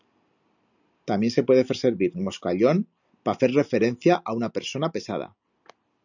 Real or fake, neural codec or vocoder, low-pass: real; none; 7.2 kHz